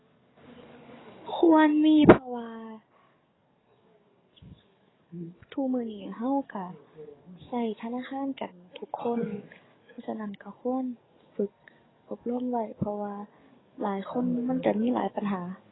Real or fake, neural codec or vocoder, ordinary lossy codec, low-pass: fake; codec, 44.1 kHz, 7.8 kbps, DAC; AAC, 16 kbps; 7.2 kHz